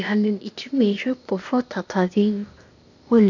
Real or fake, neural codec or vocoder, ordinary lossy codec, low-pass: fake; codec, 16 kHz, 0.8 kbps, ZipCodec; none; 7.2 kHz